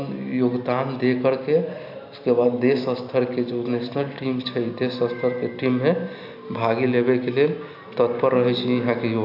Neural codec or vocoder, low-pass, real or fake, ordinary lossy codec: none; 5.4 kHz; real; AAC, 48 kbps